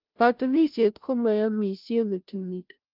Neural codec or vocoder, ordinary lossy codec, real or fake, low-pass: codec, 16 kHz, 0.5 kbps, FunCodec, trained on Chinese and English, 25 frames a second; Opus, 32 kbps; fake; 5.4 kHz